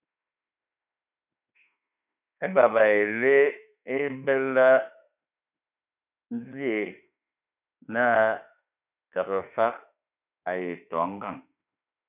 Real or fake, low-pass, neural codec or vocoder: fake; 3.6 kHz; autoencoder, 48 kHz, 32 numbers a frame, DAC-VAE, trained on Japanese speech